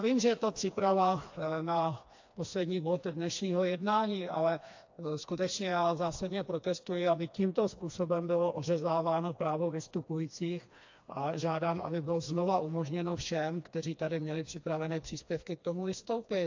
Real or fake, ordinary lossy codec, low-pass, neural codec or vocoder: fake; AAC, 48 kbps; 7.2 kHz; codec, 16 kHz, 2 kbps, FreqCodec, smaller model